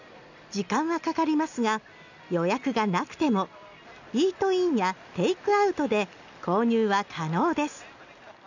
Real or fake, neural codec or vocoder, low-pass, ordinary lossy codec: real; none; 7.2 kHz; none